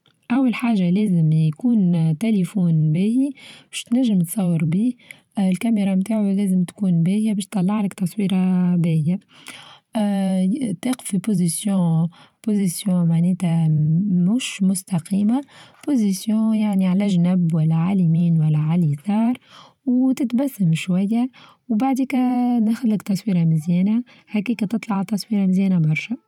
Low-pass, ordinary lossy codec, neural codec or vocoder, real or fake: 19.8 kHz; none; vocoder, 44.1 kHz, 128 mel bands every 512 samples, BigVGAN v2; fake